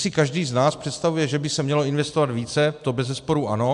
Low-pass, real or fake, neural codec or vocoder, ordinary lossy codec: 10.8 kHz; real; none; AAC, 96 kbps